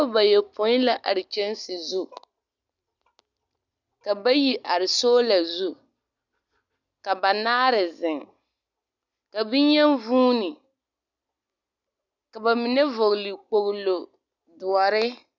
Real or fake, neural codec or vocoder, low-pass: real; none; 7.2 kHz